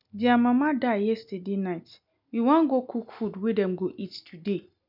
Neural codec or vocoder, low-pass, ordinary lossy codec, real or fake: none; 5.4 kHz; none; real